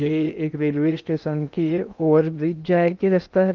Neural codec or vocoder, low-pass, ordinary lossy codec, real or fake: codec, 16 kHz in and 24 kHz out, 0.6 kbps, FocalCodec, streaming, 2048 codes; 7.2 kHz; Opus, 24 kbps; fake